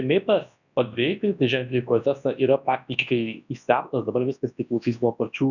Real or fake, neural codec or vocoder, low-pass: fake; codec, 24 kHz, 0.9 kbps, WavTokenizer, large speech release; 7.2 kHz